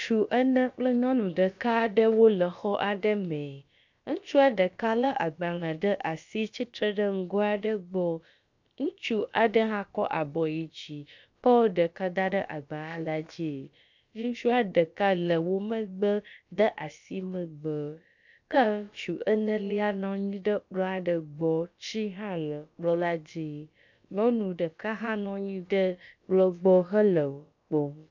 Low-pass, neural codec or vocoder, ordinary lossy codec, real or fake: 7.2 kHz; codec, 16 kHz, about 1 kbps, DyCAST, with the encoder's durations; MP3, 64 kbps; fake